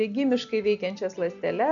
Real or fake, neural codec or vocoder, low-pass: real; none; 7.2 kHz